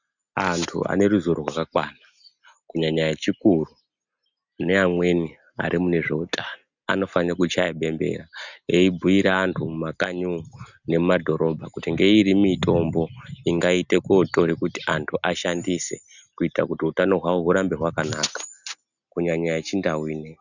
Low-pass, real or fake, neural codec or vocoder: 7.2 kHz; real; none